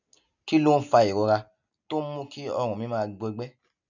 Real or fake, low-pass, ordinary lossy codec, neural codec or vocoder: real; 7.2 kHz; none; none